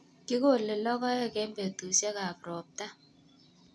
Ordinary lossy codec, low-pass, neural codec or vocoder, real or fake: none; none; none; real